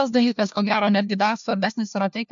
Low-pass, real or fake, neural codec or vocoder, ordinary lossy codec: 7.2 kHz; fake; codec, 16 kHz, 1 kbps, FunCodec, trained on LibriTTS, 50 frames a second; MP3, 64 kbps